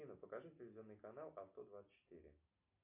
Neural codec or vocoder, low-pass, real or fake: none; 3.6 kHz; real